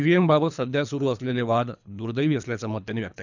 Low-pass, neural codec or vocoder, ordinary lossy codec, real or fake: 7.2 kHz; codec, 24 kHz, 3 kbps, HILCodec; none; fake